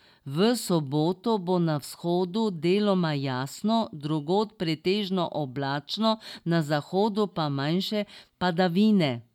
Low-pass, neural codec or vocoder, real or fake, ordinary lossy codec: 19.8 kHz; none; real; none